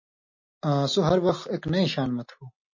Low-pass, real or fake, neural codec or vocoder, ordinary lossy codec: 7.2 kHz; real; none; MP3, 32 kbps